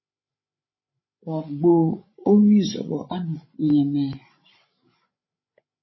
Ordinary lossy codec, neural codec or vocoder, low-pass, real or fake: MP3, 24 kbps; codec, 16 kHz, 16 kbps, FreqCodec, larger model; 7.2 kHz; fake